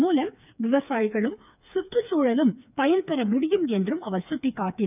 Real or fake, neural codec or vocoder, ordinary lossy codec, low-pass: fake; codec, 16 kHz, 4 kbps, FreqCodec, smaller model; none; 3.6 kHz